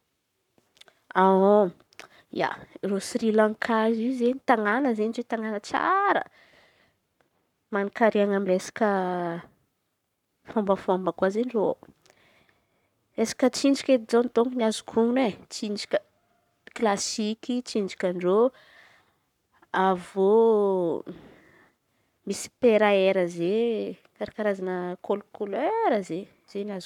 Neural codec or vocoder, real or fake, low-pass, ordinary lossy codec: codec, 44.1 kHz, 7.8 kbps, Pupu-Codec; fake; 19.8 kHz; none